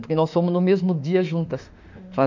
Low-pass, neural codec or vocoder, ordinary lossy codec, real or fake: 7.2 kHz; autoencoder, 48 kHz, 32 numbers a frame, DAC-VAE, trained on Japanese speech; MP3, 64 kbps; fake